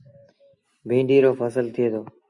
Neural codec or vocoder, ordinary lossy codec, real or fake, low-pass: none; Opus, 64 kbps; real; 10.8 kHz